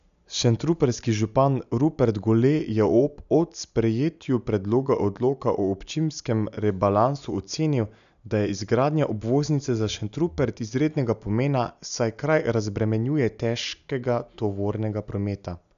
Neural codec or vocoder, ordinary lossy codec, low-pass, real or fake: none; none; 7.2 kHz; real